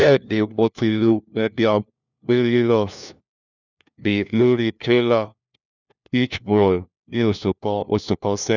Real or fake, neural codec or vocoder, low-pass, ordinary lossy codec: fake; codec, 16 kHz, 1 kbps, FunCodec, trained on LibriTTS, 50 frames a second; 7.2 kHz; none